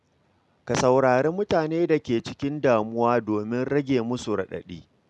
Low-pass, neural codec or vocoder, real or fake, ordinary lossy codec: none; none; real; none